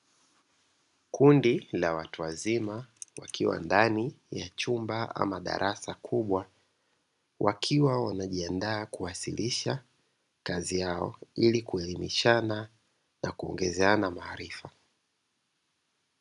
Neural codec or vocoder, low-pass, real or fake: none; 10.8 kHz; real